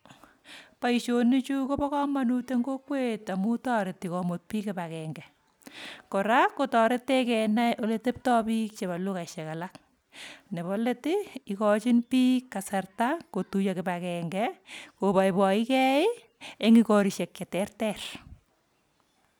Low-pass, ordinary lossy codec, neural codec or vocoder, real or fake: none; none; none; real